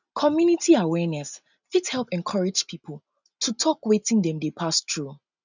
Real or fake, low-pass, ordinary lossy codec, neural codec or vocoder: real; 7.2 kHz; none; none